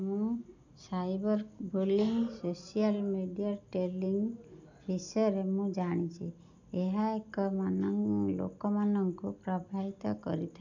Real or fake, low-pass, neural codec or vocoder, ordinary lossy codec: real; 7.2 kHz; none; none